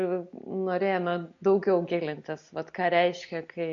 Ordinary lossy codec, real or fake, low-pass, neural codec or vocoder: MP3, 48 kbps; real; 7.2 kHz; none